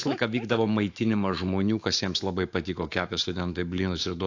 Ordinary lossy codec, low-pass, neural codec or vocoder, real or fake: AAC, 48 kbps; 7.2 kHz; autoencoder, 48 kHz, 128 numbers a frame, DAC-VAE, trained on Japanese speech; fake